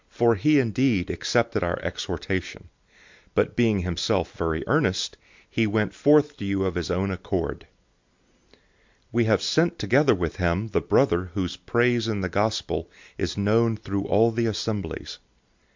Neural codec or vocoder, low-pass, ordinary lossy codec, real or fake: none; 7.2 kHz; MP3, 64 kbps; real